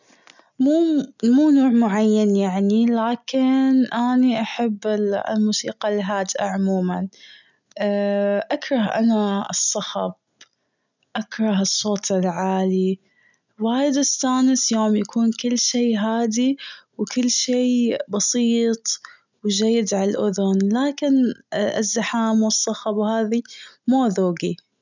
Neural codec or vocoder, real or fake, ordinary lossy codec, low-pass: none; real; none; 7.2 kHz